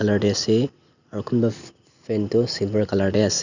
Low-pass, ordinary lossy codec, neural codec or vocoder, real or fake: 7.2 kHz; none; none; real